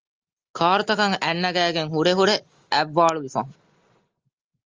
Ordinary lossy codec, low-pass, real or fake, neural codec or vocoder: Opus, 32 kbps; 7.2 kHz; real; none